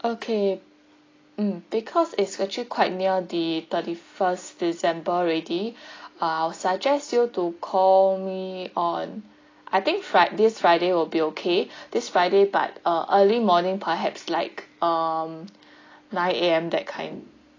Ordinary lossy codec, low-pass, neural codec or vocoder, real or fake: AAC, 32 kbps; 7.2 kHz; none; real